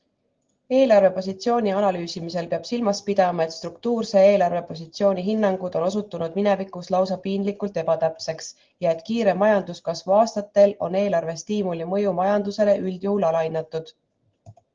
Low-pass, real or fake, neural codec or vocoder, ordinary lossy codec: 7.2 kHz; real; none; Opus, 16 kbps